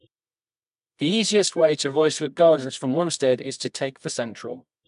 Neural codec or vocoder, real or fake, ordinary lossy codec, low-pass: codec, 24 kHz, 0.9 kbps, WavTokenizer, medium music audio release; fake; MP3, 96 kbps; 10.8 kHz